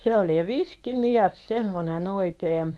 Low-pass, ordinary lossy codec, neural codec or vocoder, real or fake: none; none; codec, 24 kHz, 0.9 kbps, WavTokenizer, medium speech release version 1; fake